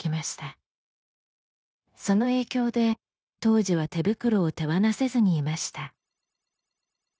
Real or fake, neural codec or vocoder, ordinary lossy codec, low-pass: fake; codec, 16 kHz, 0.9 kbps, LongCat-Audio-Codec; none; none